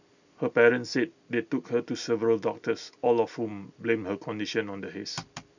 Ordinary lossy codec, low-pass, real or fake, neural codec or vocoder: none; 7.2 kHz; real; none